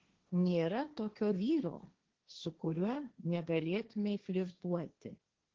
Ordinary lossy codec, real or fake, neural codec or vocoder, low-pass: Opus, 16 kbps; fake; codec, 16 kHz, 1.1 kbps, Voila-Tokenizer; 7.2 kHz